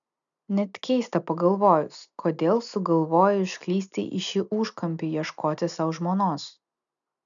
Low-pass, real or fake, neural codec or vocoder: 7.2 kHz; real; none